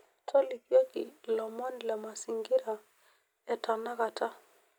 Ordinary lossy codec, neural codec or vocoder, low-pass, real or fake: none; none; none; real